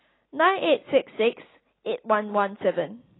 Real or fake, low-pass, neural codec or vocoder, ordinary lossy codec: real; 7.2 kHz; none; AAC, 16 kbps